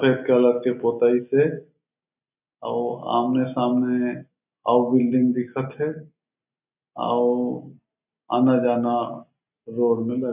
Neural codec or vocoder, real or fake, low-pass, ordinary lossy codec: none; real; 3.6 kHz; none